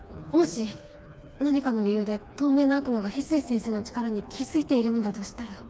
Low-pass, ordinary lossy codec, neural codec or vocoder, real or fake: none; none; codec, 16 kHz, 2 kbps, FreqCodec, smaller model; fake